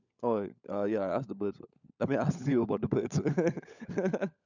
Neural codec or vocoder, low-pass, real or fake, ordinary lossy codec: codec, 16 kHz, 16 kbps, FunCodec, trained on LibriTTS, 50 frames a second; 7.2 kHz; fake; none